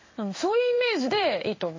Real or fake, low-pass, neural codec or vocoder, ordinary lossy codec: fake; 7.2 kHz; codec, 16 kHz in and 24 kHz out, 1 kbps, XY-Tokenizer; MP3, 32 kbps